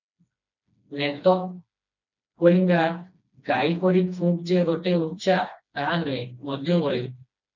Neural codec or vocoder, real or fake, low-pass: codec, 16 kHz, 2 kbps, FreqCodec, smaller model; fake; 7.2 kHz